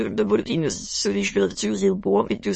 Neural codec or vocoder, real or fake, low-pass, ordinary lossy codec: autoencoder, 22.05 kHz, a latent of 192 numbers a frame, VITS, trained on many speakers; fake; 9.9 kHz; MP3, 32 kbps